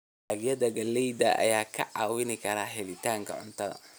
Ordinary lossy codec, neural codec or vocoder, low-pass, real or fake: none; none; none; real